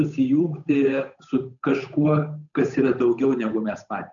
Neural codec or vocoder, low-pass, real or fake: codec, 16 kHz, 8 kbps, FunCodec, trained on Chinese and English, 25 frames a second; 7.2 kHz; fake